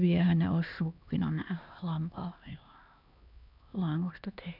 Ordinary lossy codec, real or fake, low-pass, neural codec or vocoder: none; fake; 5.4 kHz; codec, 16 kHz, 2 kbps, X-Codec, HuBERT features, trained on LibriSpeech